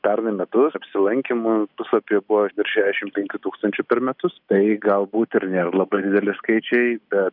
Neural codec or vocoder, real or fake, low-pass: none; real; 5.4 kHz